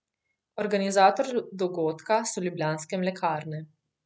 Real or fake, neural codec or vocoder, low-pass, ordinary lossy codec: real; none; none; none